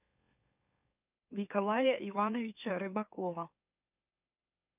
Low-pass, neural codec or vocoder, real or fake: 3.6 kHz; autoencoder, 44.1 kHz, a latent of 192 numbers a frame, MeloTTS; fake